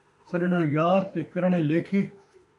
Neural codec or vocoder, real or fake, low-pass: autoencoder, 48 kHz, 32 numbers a frame, DAC-VAE, trained on Japanese speech; fake; 10.8 kHz